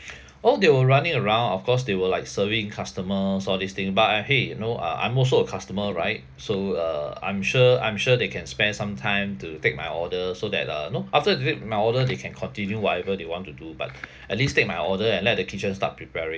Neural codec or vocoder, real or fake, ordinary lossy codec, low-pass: none; real; none; none